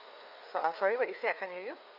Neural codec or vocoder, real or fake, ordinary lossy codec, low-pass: codec, 16 kHz, 4 kbps, FreqCodec, larger model; fake; none; 5.4 kHz